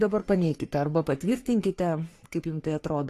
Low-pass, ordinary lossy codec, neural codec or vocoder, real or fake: 14.4 kHz; AAC, 48 kbps; codec, 44.1 kHz, 3.4 kbps, Pupu-Codec; fake